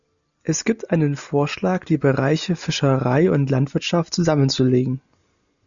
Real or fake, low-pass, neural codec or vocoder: real; 7.2 kHz; none